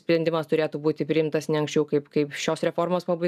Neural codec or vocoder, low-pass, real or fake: none; 14.4 kHz; real